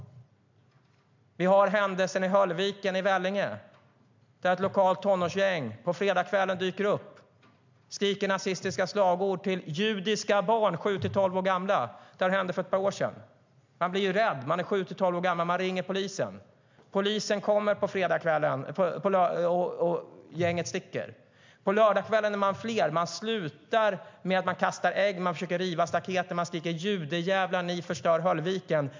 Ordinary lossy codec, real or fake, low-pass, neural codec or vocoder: MP3, 64 kbps; real; 7.2 kHz; none